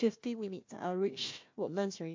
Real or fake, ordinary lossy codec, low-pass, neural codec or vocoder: fake; MP3, 48 kbps; 7.2 kHz; codec, 16 kHz, 1 kbps, FunCodec, trained on Chinese and English, 50 frames a second